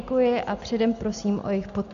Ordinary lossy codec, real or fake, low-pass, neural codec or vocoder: AAC, 96 kbps; real; 7.2 kHz; none